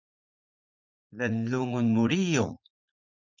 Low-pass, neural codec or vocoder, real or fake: 7.2 kHz; vocoder, 44.1 kHz, 80 mel bands, Vocos; fake